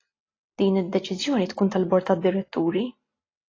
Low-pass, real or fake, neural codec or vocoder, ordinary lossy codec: 7.2 kHz; real; none; AAC, 32 kbps